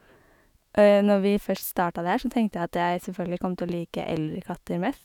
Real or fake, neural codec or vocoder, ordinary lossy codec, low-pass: fake; autoencoder, 48 kHz, 128 numbers a frame, DAC-VAE, trained on Japanese speech; none; 19.8 kHz